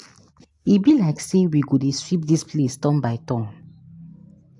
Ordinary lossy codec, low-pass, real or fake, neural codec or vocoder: AAC, 64 kbps; 10.8 kHz; real; none